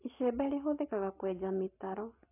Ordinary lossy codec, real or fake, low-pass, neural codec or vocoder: AAC, 24 kbps; real; 3.6 kHz; none